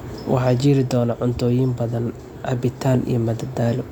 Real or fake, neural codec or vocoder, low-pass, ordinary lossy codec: real; none; 19.8 kHz; none